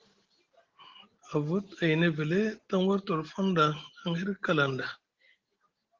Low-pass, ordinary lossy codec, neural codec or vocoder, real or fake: 7.2 kHz; Opus, 16 kbps; none; real